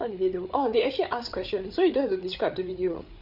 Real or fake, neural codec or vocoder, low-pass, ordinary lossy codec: fake; codec, 16 kHz, 8 kbps, FreqCodec, larger model; 5.4 kHz; none